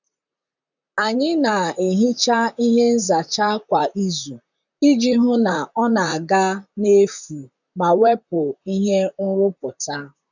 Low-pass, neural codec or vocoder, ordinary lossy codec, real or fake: 7.2 kHz; vocoder, 44.1 kHz, 128 mel bands, Pupu-Vocoder; none; fake